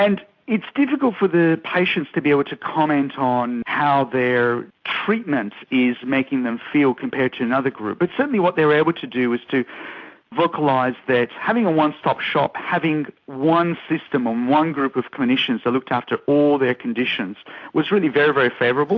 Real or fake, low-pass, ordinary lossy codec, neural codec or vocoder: real; 7.2 kHz; AAC, 48 kbps; none